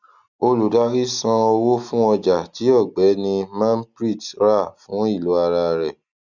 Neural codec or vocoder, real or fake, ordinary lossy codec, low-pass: none; real; none; 7.2 kHz